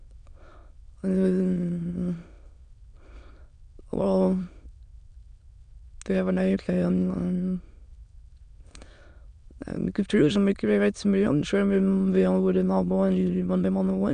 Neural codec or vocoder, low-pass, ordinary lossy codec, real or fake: autoencoder, 22.05 kHz, a latent of 192 numbers a frame, VITS, trained on many speakers; 9.9 kHz; none; fake